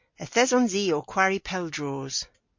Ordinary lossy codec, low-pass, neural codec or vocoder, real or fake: MP3, 48 kbps; 7.2 kHz; none; real